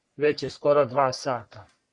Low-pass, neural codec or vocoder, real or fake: 10.8 kHz; codec, 44.1 kHz, 3.4 kbps, Pupu-Codec; fake